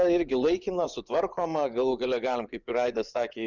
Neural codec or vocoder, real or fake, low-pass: vocoder, 24 kHz, 100 mel bands, Vocos; fake; 7.2 kHz